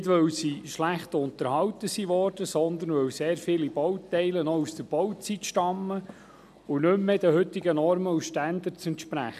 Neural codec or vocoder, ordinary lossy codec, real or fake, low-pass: none; none; real; 14.4 kHz